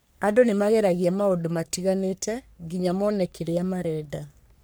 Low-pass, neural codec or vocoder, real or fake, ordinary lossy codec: none; codec, 44.1 kHz, 3.4 kbps, Pupu-Codec; fake; none